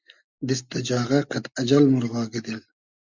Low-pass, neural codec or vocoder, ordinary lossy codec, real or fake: 7.2 kHz; none; Opus, 64 kbps; real